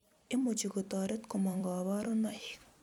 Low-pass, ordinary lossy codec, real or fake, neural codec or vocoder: 19.8 kHz; none; fake; vocoder, 44.1 kHz, 128 mel bands every 256 samples, BigVGAN v2